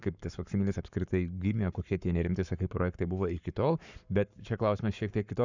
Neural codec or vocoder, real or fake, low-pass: codec, 44.1 kHz, 7.8 kbps, Pupu-Codec; fake; 7.2 kHz